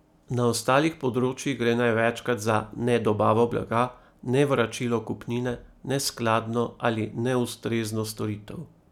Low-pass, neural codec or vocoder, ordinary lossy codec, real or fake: 19.8 kHz; none; none; real